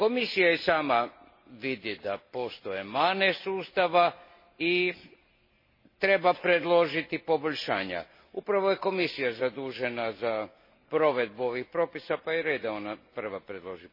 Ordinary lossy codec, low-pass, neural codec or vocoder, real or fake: MP3, 24 kbps; 5.4 kHz; none; real